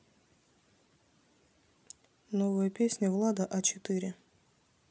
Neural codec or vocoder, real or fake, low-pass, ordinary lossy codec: none; real; none; none